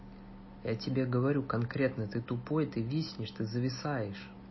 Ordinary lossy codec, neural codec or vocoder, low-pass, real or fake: MP3, 24 kbps; none; 7.2 kHz; real